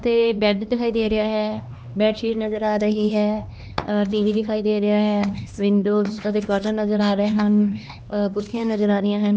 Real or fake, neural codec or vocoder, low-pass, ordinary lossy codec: fake; codec, 16 kHz, 2 kbps, X-Codec, HuBERT features, trained on LibriSpeech; none; none